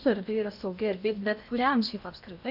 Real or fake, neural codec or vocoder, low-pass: fake; codec, 16 kHz in and 24 kHz out, 0.8 kbps, FocalCodec, streaming, 65536 codes; 5.4 kHz